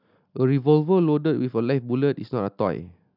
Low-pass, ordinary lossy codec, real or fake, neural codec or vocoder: 5.4 kHz; none; real; none